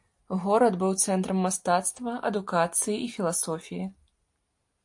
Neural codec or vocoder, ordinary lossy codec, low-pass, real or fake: none; AAC, 64 kbps; 10.8 kHz; real